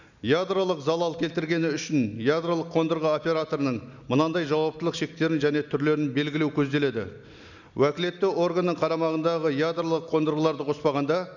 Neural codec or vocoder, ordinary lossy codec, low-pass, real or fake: none; none; 7.2 kHz; real